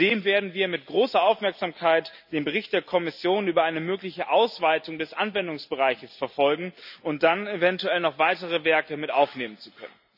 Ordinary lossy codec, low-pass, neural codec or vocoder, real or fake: none; 5.4 kHz; none; real